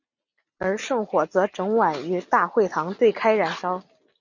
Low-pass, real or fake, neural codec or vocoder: 7.2 kHz; real; none